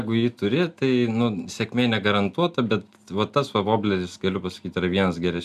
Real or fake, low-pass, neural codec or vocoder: real; 14.4 kHz; none